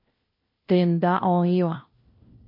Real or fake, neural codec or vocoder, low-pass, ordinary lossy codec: fake; codec, 16 kHz, 1 kbps, FunCodec, trained on LibriTTS, 50 frames a second; 5.4 kHz; MP3, 32 kbps